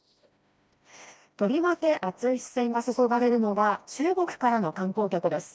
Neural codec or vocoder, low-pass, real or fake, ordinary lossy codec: codec, 16 kHz, 1 kbps, FreqCodec, smaller model; none; fake; none